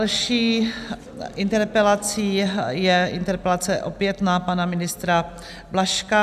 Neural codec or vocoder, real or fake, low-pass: none; real; 14.4 kHz